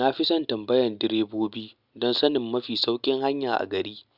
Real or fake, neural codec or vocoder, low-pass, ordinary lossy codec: real; none; 5.4 kHz; Opus, 64 kbps